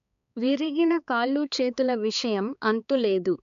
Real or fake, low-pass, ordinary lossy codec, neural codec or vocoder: fake; 7.2 kHz; none; codec, 16 kHz, 4 kbps, X-Codec, HuBERT features, trained on balanced general audio